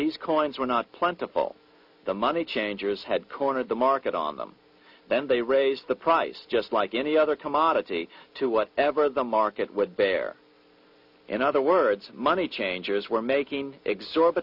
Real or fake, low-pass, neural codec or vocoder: real; 5.4 kHz; none